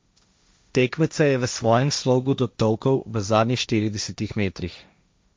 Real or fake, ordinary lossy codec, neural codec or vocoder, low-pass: fake; none; codec, 16 kHz, 1.1 kbps, Voila-Tokenizer; none